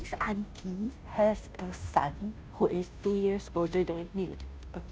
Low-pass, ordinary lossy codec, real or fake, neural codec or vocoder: none; none; fake; codec, 16 kHz, 0.5 kbps, FunCodec, trained on Chinese and English, 25 frames a second